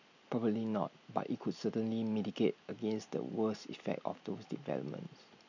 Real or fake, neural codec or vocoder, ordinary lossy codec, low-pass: real; none; none; 7.2 kHz